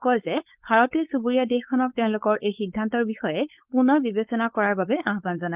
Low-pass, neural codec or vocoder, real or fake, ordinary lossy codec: 3.6 kHz; codec, 16 kHz, 4.8 kbps, FACodec; fake; Opus, 32 kbps